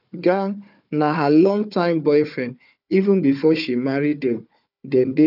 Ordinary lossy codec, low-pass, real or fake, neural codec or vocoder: none; 5.4 kHz; fake; codec, 16 kHz, 4 kbps, FunCodec, trained on Chinese and English, 50 frames a second